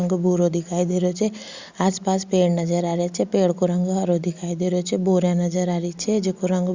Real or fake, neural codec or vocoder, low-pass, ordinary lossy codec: real; none; 7.2 kHz; Opus, 64 kbps